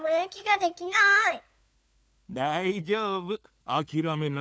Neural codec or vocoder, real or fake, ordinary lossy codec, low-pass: codec, 16 kHz, 4 kbps, FunCodec, trained on LibriTTS, 50 frames a second; fake; none; none